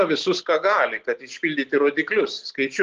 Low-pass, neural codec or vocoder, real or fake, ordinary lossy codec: 7.2 kHz; none; real; Opus, 16 kbps